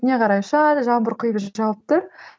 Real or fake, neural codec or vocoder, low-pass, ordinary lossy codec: real; none; none; none